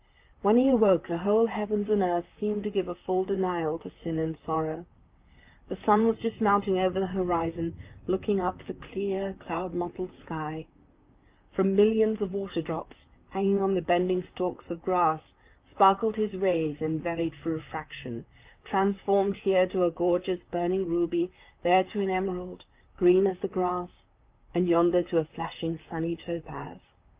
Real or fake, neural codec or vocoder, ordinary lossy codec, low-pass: fake; vocoder, 44.1 kHz, 128 mel bands, Pupu-Vocoder; Opus, 24 kbps; 3.6 kHz